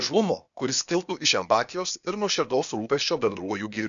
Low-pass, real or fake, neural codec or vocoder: 7.2 kHz; fake; codec, 16 kHz, 0.8 kbps, ZipCodec